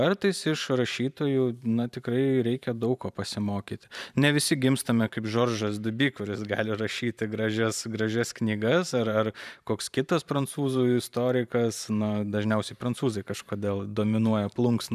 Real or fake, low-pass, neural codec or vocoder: fake; 14.4 kHz; vocoder, 44.1 kHz, 128 mel bands every 512 samples, BigVGAN v2